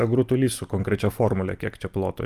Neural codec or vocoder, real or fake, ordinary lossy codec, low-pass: none; real; Opus, 32 kbps; 14.4 kHz